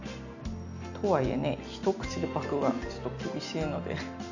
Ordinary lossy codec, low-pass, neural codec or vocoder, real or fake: none; 7.2 kHz; none; real